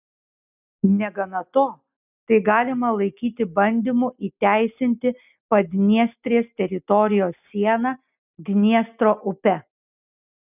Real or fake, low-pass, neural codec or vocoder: real; 3.6 kHz; none